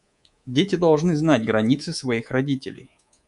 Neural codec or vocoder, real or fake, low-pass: codec, 24 kHz, 3.1 kbps, DualCodec; fake; 10.8 kHz